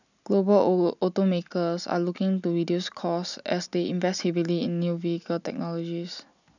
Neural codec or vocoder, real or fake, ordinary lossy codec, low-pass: none; real; none; 7.2 kHz